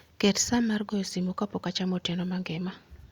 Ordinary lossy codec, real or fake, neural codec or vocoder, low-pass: Opus, 64 kbps; real; none; 19.8 kHz